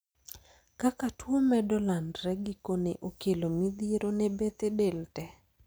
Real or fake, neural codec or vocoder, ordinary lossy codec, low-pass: real; none; none; none